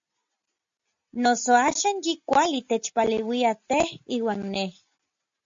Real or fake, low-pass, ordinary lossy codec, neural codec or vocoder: real; 7.2 kHz; MP3, 48 kbps; none